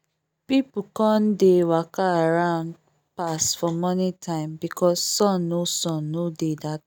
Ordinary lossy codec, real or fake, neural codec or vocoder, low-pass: none; real; none; none